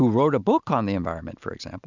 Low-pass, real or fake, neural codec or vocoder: 7.2 kHz; real; none